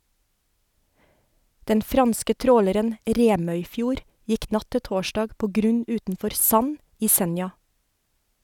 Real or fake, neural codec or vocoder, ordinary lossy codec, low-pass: real; none; none; 19.8 kHz